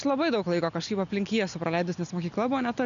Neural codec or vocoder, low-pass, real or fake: none; 7.2 kHz; real